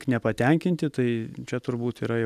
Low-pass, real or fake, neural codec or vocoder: 14.4 kHz; real; none